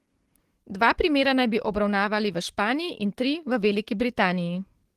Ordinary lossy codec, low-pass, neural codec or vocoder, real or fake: Opus, 16 kbps; 14.4 kHz; codec, 44.1 kHz, 7.8 kbps, DAC; fake